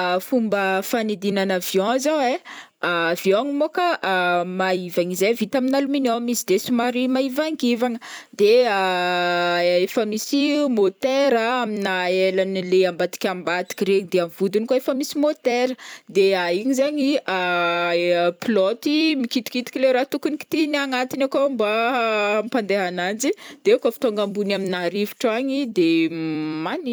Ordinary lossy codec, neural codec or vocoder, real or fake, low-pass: none; vocoder, 44.1 kHz, 128 mel bands every 256 samples, BigVGAN v2; fake; none